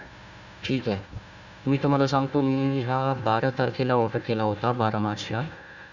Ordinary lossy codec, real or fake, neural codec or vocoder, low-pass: none; fake; codec, 16 kHz, 1 kbps, FunCodec, trained on Chinese and English, 50 frames a second; 7.2 kHz